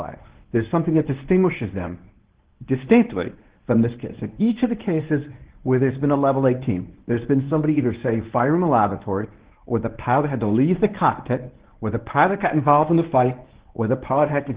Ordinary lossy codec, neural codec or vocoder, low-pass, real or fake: Opus, 16 kbps; codec, 24 kHz, 0.9 kbps, WavTokenizer, medium speech release version 1; 3.6 kHz; fake